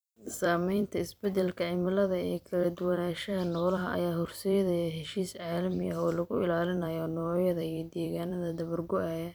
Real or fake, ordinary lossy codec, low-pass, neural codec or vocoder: fake; none; none; vocoder, 44.1 kHz, 128 mel bands every 256 samples, BigVGAN v2